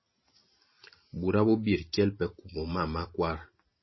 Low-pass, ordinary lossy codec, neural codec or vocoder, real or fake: 7.2 kHz; MP3, 24 kbps; none; real